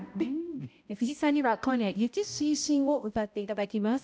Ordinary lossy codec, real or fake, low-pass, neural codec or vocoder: none; fake; none; codec, 16 kHz, 0.5 kbps, X-Codec, HuBERT features, trained on balanced general audio